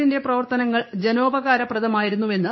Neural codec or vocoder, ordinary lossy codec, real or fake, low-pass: codec, 16 kHz, 6 kbps, DAC; MP3, 24 kbps; fake; 7.2 kHz